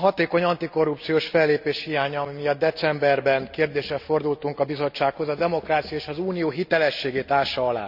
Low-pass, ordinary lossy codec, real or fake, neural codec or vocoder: 5.4 kHz; none; real; none